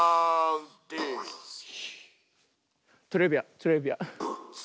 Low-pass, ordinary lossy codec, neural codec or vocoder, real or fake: none; none; none; real